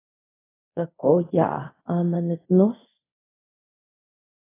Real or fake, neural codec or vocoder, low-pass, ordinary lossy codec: fake; codec, 24 kHz, 0.5 kbps, DualCodec; 3.6 kHz; AAC, 16 kbps